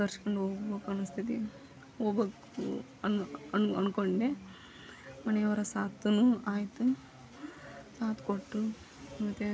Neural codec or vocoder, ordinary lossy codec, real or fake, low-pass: none; none; real; none